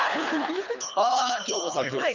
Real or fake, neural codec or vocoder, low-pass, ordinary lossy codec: fake; codec, 24 kHz, 3 kbps, HILCodec; 7.2 kHz; none